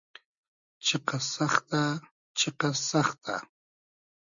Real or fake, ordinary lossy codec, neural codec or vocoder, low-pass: real; MP3, 64 kbps; none; 7.2 kHz